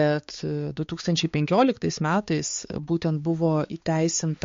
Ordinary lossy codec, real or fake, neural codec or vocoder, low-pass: MP3, 48 kbps; fake; codec, 16 kHz, 4 kbps, X-Codec, HuBERT features, trained on balanced general audio; 7.2 kHz